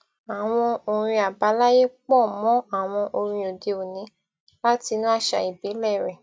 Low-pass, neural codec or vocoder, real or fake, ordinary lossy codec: none; none; real; none